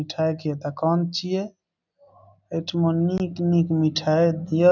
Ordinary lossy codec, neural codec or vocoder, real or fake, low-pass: none; none; real; 7.2 kHz